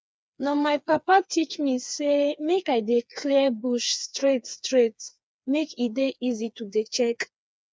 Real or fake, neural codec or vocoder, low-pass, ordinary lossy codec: fake; codec, 16 kHz, 4 kbps, FreqCodec, smaller model; none; none